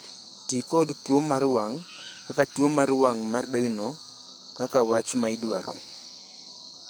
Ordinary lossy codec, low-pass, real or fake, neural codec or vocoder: none; none; fake; codec, 44.1 kHz, 2.6 kbps, SNAC